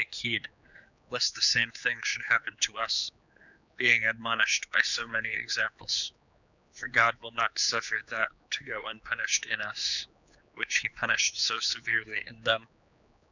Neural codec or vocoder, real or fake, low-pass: codec, 16 kHz, 4 kbps, X-Codec, HuBERT features, trained on general audio; fake; 7.2 kHz